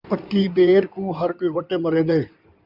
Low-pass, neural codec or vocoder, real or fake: 5.4 kHz; codec, 16 kHz in and 24 kHz out, 2.2 kbps, FireRedTTS-2 codec; fake